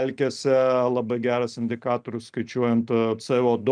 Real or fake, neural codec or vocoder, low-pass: real; none; 9.9 kHz